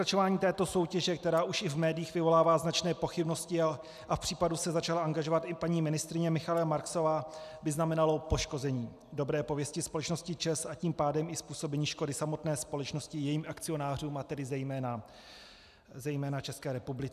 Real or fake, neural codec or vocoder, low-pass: real; none; 14.4 kHz